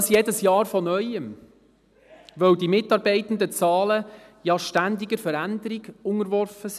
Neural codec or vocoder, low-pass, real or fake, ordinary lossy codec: none; 14.4 kHz; real; none